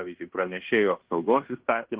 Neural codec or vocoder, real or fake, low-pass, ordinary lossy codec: codec, 16 kHz in and 24 kHz out, 0.9 kbps, LongCat-Audio-Codec, fine tuned four codebook decoder; fake; 3.6 kHz; Opus, 16 kbps